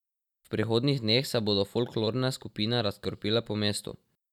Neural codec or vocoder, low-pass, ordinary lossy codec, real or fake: none; 19.8 kHz; none; real